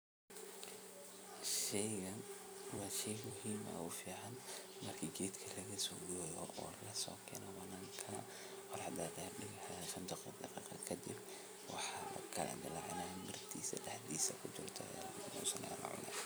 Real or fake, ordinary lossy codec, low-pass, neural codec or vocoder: fake; none; none; vocoder, 44.1 kHz, 128 mel bands every 256 samples, BigVGAN v2